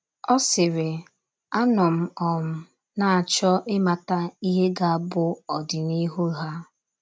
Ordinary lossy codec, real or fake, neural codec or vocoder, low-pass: none; real; none; none